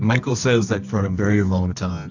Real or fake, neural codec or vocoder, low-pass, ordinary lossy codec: fake; codec, 24 kHz, 0.9 kbps, WavTokenizer, medium music audio release; 7.2 kHz; AAC, 48 kbps